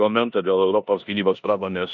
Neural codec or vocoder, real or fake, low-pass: codec, 16 kHz in and 24 kHz out, 0.9 kbps, LongCat-Audio-Codec, four codebook decoder; fake; 7.2 kHz